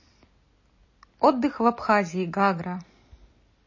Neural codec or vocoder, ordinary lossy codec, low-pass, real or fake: none; MP3, 32 kbps; 7.2 kHz; real